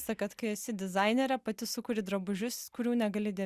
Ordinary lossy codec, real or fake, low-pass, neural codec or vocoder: Opus, 64 kbps; real; 14.4 kHz; none